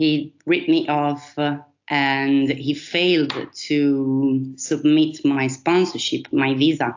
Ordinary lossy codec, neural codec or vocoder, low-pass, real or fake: AAC, 48 kbps; none; 7.2 kHz; real